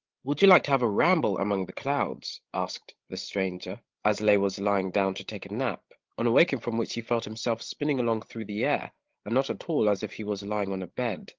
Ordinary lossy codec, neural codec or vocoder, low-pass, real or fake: Opus, 16 kbps; codec, 16 kHz, 16 kbps, FreqCodec, larger model; 7.2 kHz; fake